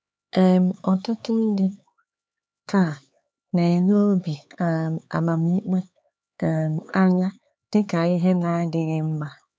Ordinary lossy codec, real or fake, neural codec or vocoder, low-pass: none; fake; codec, 16 kHz, 4 kbps, X-Codec, HuBERT features, trained on LibriSpeech; none